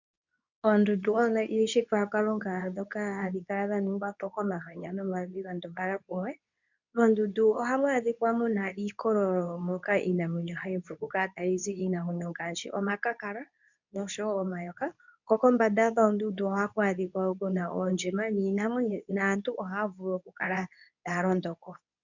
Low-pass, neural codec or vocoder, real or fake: 7.2 kHz; codec, 24 kHz, 0.9 kbps, WavTokenizer, medium speech release version 2; fake